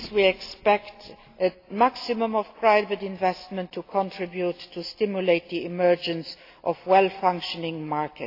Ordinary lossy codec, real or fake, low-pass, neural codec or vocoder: AAC, 32 kbps; real; 5.4 kHz; none